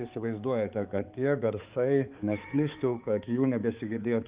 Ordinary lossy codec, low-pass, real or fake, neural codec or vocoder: Opus, 24 kbps; 3.6 kHz; fake; codec, 16 kHz, 4 kbps, X-Codec, HuBERT features, trained on balanced general audio